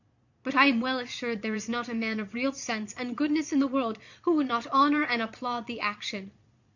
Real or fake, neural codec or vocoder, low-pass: fake; vocoder, 22.05 kHz, 80 mel bands, Vocos; 7.2 kHz